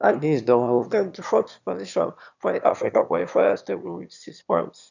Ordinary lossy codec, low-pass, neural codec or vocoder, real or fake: none; 7.2 kHz; autoencoder, 22.05 kHz, a latent of 192 numbers a frame, VITS, trained on one speaker; fake